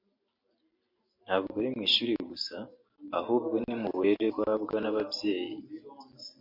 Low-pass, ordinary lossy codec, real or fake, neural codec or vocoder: 5.4 kHz; MP3, 32 kbps; real; none